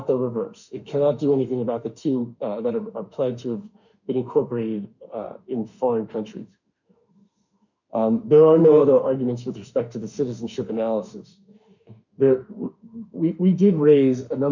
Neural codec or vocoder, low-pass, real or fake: autoencoder, 48 kHz, 32 numbers a frame, DAC-VAE, trained on Japanese speech; 7.2 kHz; fake